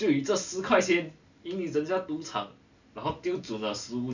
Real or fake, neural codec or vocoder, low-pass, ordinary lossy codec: real; none; 7.2 kHz; none